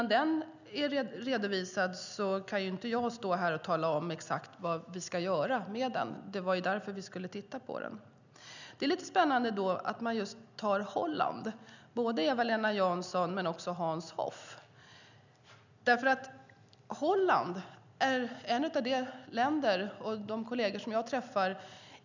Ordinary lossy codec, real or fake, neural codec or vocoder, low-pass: none; real; none; 7.2 kHz